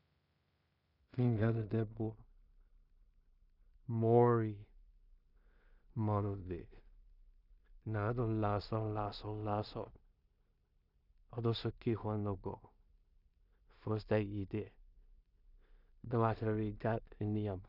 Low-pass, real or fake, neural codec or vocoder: 5.4 kHz; fake; codec, 16 kHz in and 24 kHz out, 0.4 kbps, LongCat-Audio-Codec, two codebook decoder